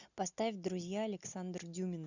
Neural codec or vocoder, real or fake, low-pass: none; real; 7.2 kHz